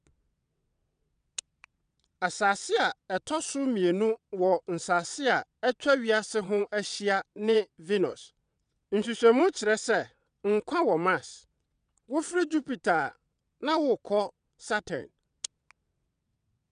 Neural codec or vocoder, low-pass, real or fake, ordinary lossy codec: vocoder, 22.05 kHz, 80 mel bands, WaveNeXt; 9.9 kHz; fake; none